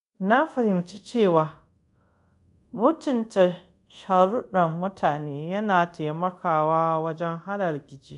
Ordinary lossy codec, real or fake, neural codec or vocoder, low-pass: none; fake; codec, 24 kHz, 0.5 kbps, DualCodec; 10.8 kHz